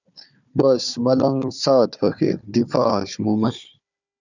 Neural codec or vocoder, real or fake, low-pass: codec, 16 kHz, 4 kbps, FunCodec, trained on Chinese and English, 50 frames a second; fake; 7.2 kHz